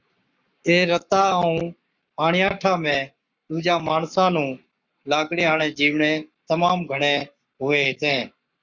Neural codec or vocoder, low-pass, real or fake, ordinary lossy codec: codec, 44.1 kHz, 7.8 kbps, Pupu-Codec; 7.2 kHz; fake; Opus, 64 kbps